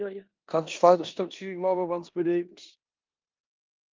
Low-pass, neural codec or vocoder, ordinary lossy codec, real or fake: 7.2 kHz; codec, 16 kHz in and 24 kHz out, 0.9 kbps, LongCat-Audio-Codec, four codebook decoder; Opus, 24 kbps; fake